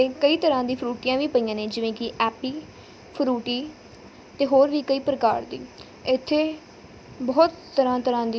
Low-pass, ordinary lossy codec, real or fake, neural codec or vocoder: none; none; real; none